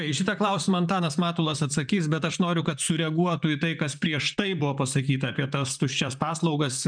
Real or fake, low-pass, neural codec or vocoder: fake; 9.9 kHz; vocoder, 44.1 kHz, 128 mel bands, Pupu-Vocoder